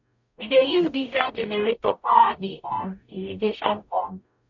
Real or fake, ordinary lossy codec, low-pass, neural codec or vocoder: fake; none; 7.2 kHz; codec, 44.1 kHz, 0.9 kbps, DAC